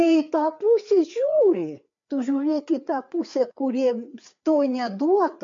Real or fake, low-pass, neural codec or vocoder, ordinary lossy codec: fake; 7.2 kHz; codec, 16 kHz, 4 kbps, FreqCodec, larger model; MP3, 48 kbps